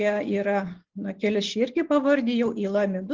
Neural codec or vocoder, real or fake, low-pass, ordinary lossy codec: none; real; 7.2 kHz; Opus, 16 kbps